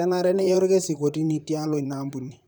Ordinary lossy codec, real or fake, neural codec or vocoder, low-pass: none; fake; vocoder, 44.1 kHz, 128 mel bands, Pupu-Vocoder; none